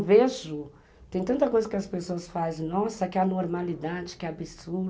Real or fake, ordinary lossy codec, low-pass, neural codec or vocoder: real; none; none; none